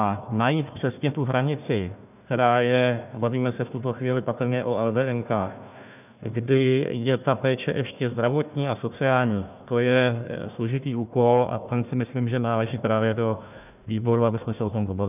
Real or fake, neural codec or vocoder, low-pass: fake; codec, 16 kHz, 1 kbps, FunCodec, trained on Chinese and English, 50 frames a second; 3.6 kHz